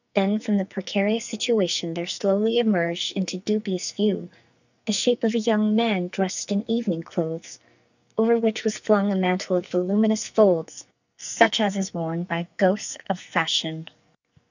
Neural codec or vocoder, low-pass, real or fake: codec, 44.1 kHz, 2.6 kbps, SNAC; 7.2 kHz; fake